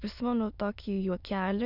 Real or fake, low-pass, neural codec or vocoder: fake; 5.4 kHz; autoencoder, 22.05 kHz, a latent of 192 numbers a frame, VITS, trained on many speakers